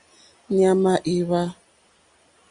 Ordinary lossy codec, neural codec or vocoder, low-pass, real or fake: Opus, 64 kbps; none; 9.9 kHz; real